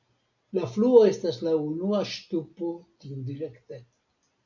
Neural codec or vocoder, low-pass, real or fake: none; 7.2 kHz; real